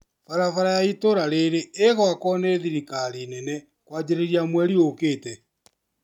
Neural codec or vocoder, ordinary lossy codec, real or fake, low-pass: none; none; real; 19.8 kHz